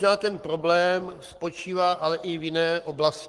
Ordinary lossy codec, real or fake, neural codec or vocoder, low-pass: Opus, 24 kbps; fake; codec, 44.1 kHz, 7.8 kbps, Pupu-Codec; 10.8 kHz